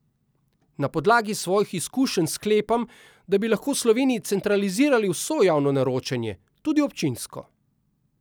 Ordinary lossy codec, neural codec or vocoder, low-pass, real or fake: none; none; none; real